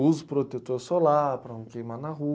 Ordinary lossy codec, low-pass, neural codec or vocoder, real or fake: none; none; none; real